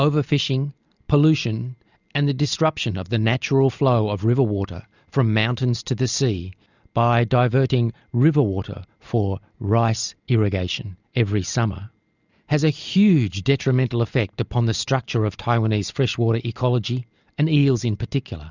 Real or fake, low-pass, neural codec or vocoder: real; 7.2 kHz; none